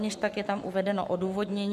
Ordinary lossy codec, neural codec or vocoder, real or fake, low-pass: AAC, 96 kbps; codec, 44.1 kHz, 7.8 kbps, Pupu-Codec; fake; 14.4 kHz